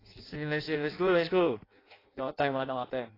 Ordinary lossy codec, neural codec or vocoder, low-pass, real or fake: AAC, 24 kbps; codec, 16 kHz in and 24 kHz out, 0.6 kbps, FireRedTTS-2 codec; 5.4 kHz; fake